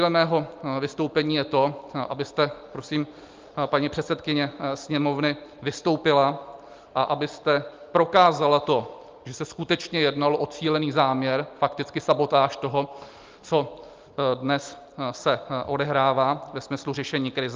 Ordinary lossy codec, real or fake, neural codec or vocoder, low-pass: Opus, 24 kbps; real; none; 7.2 kHz